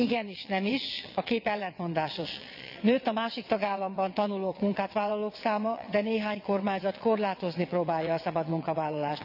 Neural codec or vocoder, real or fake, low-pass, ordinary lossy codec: vocoder, 22.05 kHz, 80 mel bands, WaveNeXt; fake; 5.4 kHz; none